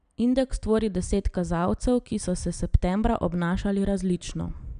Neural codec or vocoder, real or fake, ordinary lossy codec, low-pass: none; real; none; 9.9 kHz